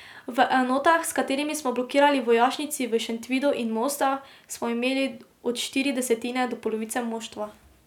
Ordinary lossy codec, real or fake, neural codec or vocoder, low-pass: none; real; none; 19.8 kHz